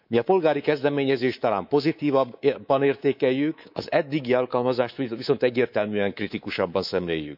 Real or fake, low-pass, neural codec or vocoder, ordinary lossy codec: fake; 5.4 kHz; codec, 24 kHz, 3.1 kbps, DualCodec; none